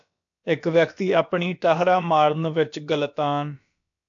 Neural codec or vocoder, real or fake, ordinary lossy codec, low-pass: codec, 16 kHz, about 1 kbps, DyCAST, with the encoder's durations; fake; AAC, 64 kbps; 7.2 kHz